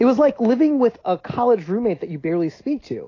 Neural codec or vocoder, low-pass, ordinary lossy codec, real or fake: none; 7.2 kHz; AAC, 32 kbps; real